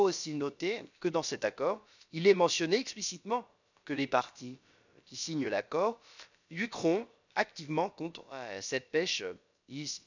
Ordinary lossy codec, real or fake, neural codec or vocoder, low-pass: none; fake; codec, 16 kHz, about 1 kbps, DyCAST, with the encoder's durations; 7.2 kHz